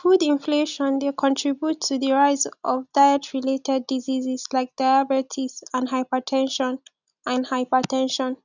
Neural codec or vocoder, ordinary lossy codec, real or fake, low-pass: none; none; real; 7.2 kHz